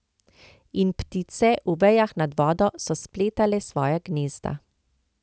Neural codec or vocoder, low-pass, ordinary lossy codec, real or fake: none; none; none; real